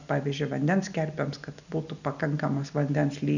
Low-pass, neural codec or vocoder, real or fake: 7.2 kHz; none; real